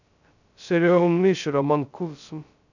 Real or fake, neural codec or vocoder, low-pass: fake; codec, 16 kHz, 0.2 kbps, FocalCodec; 7.2 kHz